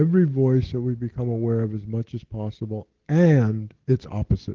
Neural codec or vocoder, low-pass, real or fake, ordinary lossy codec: none; 7.2 kHz; real; Opus, 16 kbps